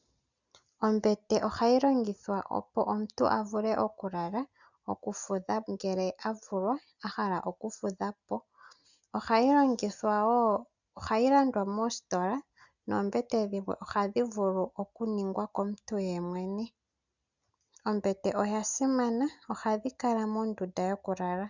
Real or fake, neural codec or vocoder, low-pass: real; none; 7.2 kHz